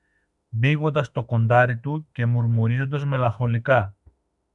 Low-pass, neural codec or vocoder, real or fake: 10.8 kHz; autoencoder, 48 kHz, 32 numbers a frame, DAC-VAE, trained on Japanese speech; fake